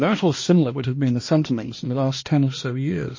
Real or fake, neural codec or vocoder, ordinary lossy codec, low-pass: fake; codec, 16 kHz, 1 kbps, X-Codec, HuBERT features, trained on balanced general audio; MP3, 32 kbps; 7.2 kHz